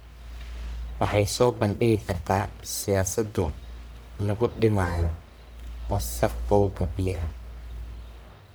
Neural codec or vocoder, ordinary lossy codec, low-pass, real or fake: codec, 44.1 kHz, 1.7 kbps, Pupu-Codec; none; none; fake